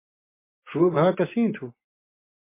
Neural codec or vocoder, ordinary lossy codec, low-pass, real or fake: vocoder, 24 kHz, 100 mel bands, Vocos; MP3, 24 kbps; 3.6 kHz; fake